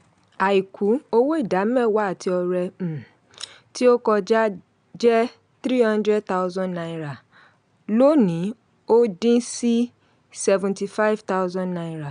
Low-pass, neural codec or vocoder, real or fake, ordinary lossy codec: 9.9 kHz; none; real; none